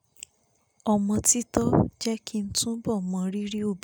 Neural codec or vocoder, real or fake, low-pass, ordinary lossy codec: none; real; none; none